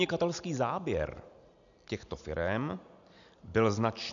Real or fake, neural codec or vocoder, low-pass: real; none; 7.2 kHz